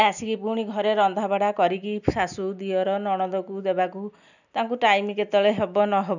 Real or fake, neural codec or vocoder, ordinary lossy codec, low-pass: real; none; none; 7.2 kHz